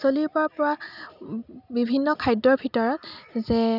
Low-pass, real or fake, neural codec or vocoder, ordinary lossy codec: 5.4 kHz; real; none; none